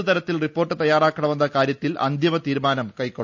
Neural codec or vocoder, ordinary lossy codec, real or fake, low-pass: none; none; real; 7.2 kHz